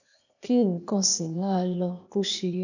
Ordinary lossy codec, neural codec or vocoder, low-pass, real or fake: none; codec, 16 kHz, 0.8 kbps, ZipCodec; 7.2 kHz; fake